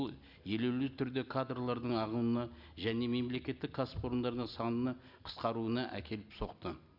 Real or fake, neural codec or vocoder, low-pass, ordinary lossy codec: real; none; 5.4 kHz; none